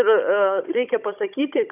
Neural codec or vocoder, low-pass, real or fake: codec, 16 kHz, 16 kbps, FunCodec, trained on Chinese and English, 50 frames a second; 3.6 kHz; fake